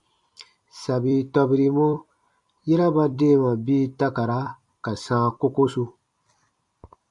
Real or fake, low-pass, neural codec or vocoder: fake; 10.8 kHz; vocoder, 44.1 kHz, 128 mel bands every 256 samples, BigVGAN v2